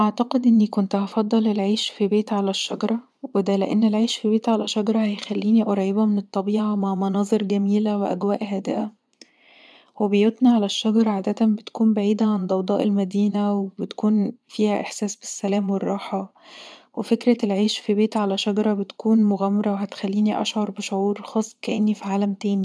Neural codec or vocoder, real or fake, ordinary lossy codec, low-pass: vocoder, 22.05 kHz, 80 mel bands, Vocos; fake; none; none